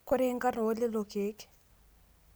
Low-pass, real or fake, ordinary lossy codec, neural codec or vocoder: none; real; none; none